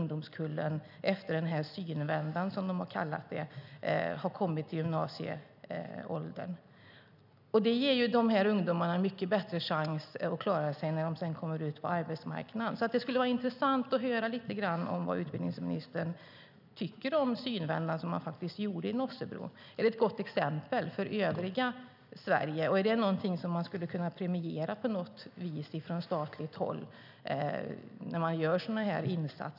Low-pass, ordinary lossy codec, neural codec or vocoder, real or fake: 5.4 kHz; none; none; real